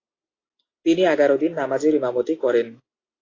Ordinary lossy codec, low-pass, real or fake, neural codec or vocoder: AAC, 32 kbps; 7.2 kHz; real; none